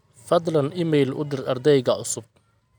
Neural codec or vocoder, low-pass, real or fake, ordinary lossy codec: none; none; real; none